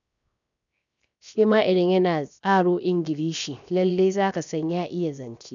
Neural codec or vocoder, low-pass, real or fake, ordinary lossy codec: codec, 16 kHz, 0.7 kbps, FocalCodec; 7.2 kHz; fake; none